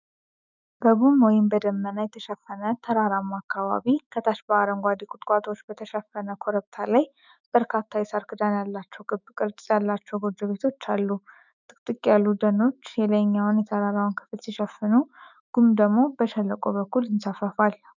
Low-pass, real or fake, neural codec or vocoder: 7.2 kHz; fake; autoencoder, 48 kHz, 128 numbers a frame, DAC-VAE, trained on Japanese speech